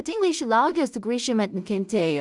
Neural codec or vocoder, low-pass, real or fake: codec, 16 kHz in and 24 kHz out, 0.4 kbps, LongCat-Audio-Codec, two codebook decoder; 10.8 kHz; fake